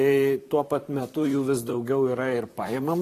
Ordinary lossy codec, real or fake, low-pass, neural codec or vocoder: AAC, 48 kbps; fake; 14.4 kHz; vocoder, 44.1 kHz, 128 mel bands, Pupu-Vocoder